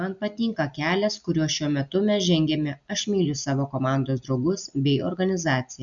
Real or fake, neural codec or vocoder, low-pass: real; none; 7.2 kHz